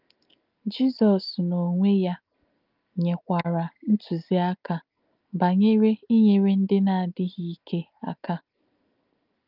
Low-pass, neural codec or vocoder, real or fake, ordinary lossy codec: 5.4 kHz; none; real; Opus, 24 kbps